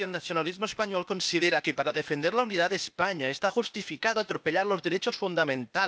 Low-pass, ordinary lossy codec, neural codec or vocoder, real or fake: none; none; codec, 16 kHz, 0.8 kbps, ZipCodec; fake